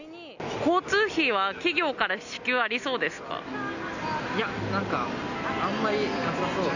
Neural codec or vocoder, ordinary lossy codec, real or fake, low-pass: none; none; real; 7.2 kHz